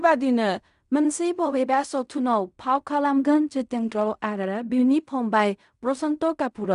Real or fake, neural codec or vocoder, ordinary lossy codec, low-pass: fake; codec, 16 kHz in and 24 kHz out, 0.4 kbps, LongCat-Audio-Codec, fine tuned four codebook decoder; none; 10.8 kHz